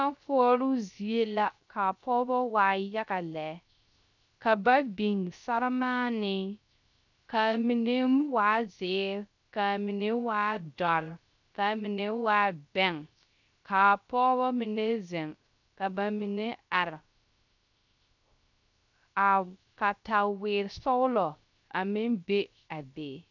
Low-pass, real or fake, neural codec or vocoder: 7.2 kHz; fake; codec, 16 kHz, 0.3 kbps, FocalCodec